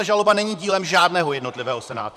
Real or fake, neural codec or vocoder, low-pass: fake; vocoder, 44.1 kHz, 128 mel bands, Pupu-Vocoder; 14.4 kHz